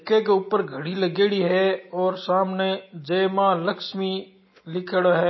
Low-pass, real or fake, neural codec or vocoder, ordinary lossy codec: 7.2 kHz; real; none; MP3, 24 kbps